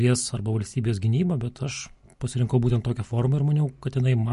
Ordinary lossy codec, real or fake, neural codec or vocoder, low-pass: MP3, 48 kbps; real; none; 14.4 kHz